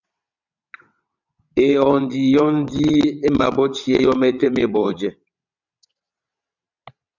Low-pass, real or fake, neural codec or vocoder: 7.2 kHz; fake; vocoder, 22.05 kHz, 80 mel bands, WaveNeXt